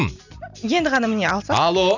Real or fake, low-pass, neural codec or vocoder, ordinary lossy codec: real; 7.2 kHz; none; none